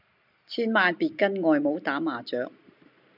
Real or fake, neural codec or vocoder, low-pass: real; none; 5.4 kHz